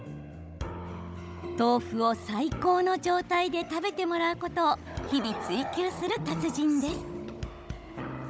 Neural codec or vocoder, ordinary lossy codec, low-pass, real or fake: codec, 16 kHz, 16 kbps, FunCodec, trained on Chinese and English, 50 frames a second; none; none; fake